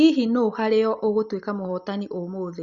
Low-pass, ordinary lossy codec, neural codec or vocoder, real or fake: 7.2 kHz; Opus, 64 kbps; none; real